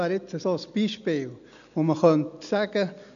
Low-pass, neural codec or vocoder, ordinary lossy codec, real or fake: 7.2 kHz; none; none; real